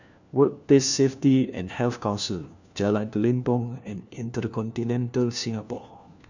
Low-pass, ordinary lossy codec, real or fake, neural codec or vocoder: 7.2 kHz; none; fake; codec, 16 kHz, 1 kbps, FunCodec, trained on LibriTTS, 50 frames a second